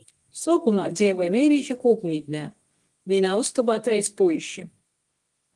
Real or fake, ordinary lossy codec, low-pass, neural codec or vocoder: fake; Opus, 24 kbps; 10.8 kHz; codec, 24 kHz, 0.9 kbps, WavTokenizer, medium music audio release